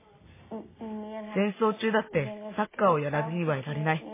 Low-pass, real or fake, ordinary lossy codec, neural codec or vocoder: 3.6 kHz; real; MP3, 16 kbps; none